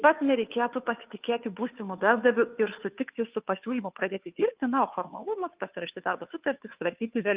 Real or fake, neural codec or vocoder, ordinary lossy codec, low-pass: fake; codec, 16 kHz, 2 kbps, FunCodec, trained on Chinese and English, 25 frames a second; Opus, 32 kbps; 3.6 kHz